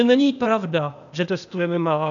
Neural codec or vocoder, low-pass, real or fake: codec, 16 kHz, 0.8 kbps, ZipCodec; 7.2 kHz; fake